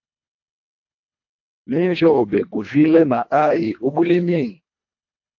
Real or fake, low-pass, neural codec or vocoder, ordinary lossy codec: fake; 7.2 kHz; codec, 24 kHz, 1.5 kbps, HILCodec; AAC, 48 kbps